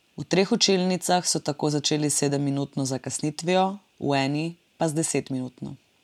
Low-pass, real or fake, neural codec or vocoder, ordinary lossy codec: 19.8 kHz; real; none; MP3, 96 kbps